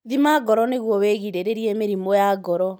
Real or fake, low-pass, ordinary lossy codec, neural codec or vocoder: real; none; none; none